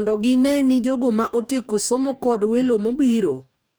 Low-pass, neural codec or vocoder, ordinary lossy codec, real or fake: none; codec, 44.1 kHz, 2.6 kbps, DAC; none; fake